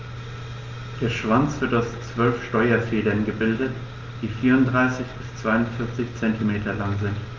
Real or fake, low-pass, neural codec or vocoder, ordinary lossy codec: real; 7.2 kHz; none; Opus, 32 kbps